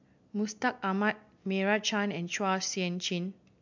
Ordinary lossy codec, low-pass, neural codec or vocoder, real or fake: MP3, 64 kbps; 7.2 kHz; none; real